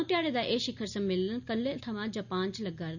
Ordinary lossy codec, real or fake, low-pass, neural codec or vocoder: none; real; 7.2 kHz; none